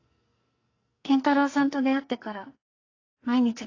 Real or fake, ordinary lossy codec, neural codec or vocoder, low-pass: fake; AAC, 32 kbps; codec, 44.1 kHz, 2.6 kbps, SNAC; 7.2 kHz